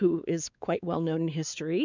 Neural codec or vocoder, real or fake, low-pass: codec, 16 kHz, 4 kbps, X-Codec, WavLM features, trained on Multilingual LibriSpeech; fake; 7.2 kHz